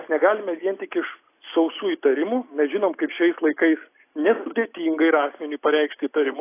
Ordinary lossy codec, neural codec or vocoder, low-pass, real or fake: AAC, 24 kbps; none; 3.6 kHz; real